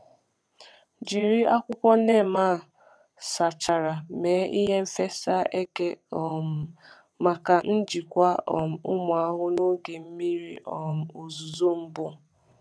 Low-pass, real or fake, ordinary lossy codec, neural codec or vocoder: none; fake; none; vocoder, 22.05 kHz, 80 mel bands, WaveNeXt